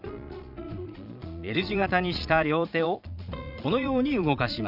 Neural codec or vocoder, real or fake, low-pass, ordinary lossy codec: vocoder, 22.05 kHz, 80 mel bands, Vocos; fake; 5.4 kHz; none